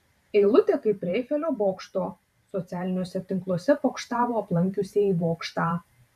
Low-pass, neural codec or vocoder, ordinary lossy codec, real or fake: 14.4 kHz; vocoder, 44.1 kHz, 128 mel bands every 512 samples, BigVGAN v2; MP3, 96 kbps; fake